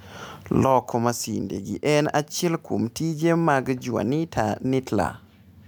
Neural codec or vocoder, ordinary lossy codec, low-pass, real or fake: none; none; none; real